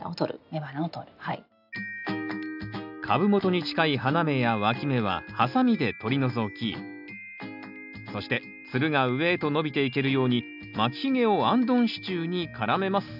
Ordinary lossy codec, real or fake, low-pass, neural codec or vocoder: none; real; 5.4 kHz; none